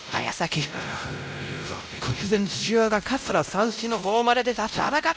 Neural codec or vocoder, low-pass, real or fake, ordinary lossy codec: codec, 16 kHz, 0.5 kbps, X-Codec, WavLM features, trained on Multilingual LibriSpeech; none; fake; none